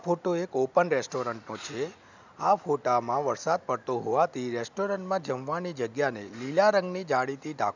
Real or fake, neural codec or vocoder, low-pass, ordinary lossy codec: real; none; 7.2 kHz; none